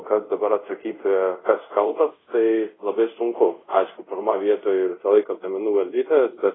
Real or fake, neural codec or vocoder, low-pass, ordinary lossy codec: fake; codec, 24 kHz, 0.5 kbps, DualCodec; 7.2 kHz; AAC, 16 kbps